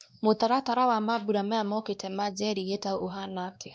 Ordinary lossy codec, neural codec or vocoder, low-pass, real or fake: none; codec, 16 kHz, 2 kbps, X-Codec, WavLM features, trained on Multilingual LibriSpeech; none; fake